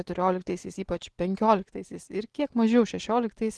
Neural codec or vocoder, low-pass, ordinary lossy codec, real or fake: none; 10.8 kHz; Opus, 16 kbps; real